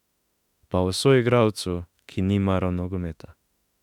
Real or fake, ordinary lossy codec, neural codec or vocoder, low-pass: fake; none; autoencoder, 48 kHz, 32 numbers a frame, DAC-VAE, trained on Japanese speech; 19.8 kHz